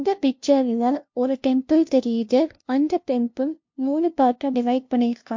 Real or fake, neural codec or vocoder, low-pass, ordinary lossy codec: fake; codec, 16 kHz, 0.5 kbps, FunCodec, trained on LibriTTS, 25 frames a second; 7.2 kHz; MP3, 48 kbps